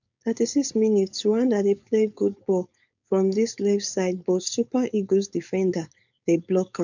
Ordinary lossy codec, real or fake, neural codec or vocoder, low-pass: none; fake; codec, 16 kHz, 4.8 kbps, FACodec; 7.2 kHz